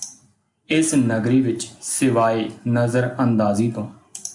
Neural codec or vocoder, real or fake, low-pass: none; real; 10.8 kHz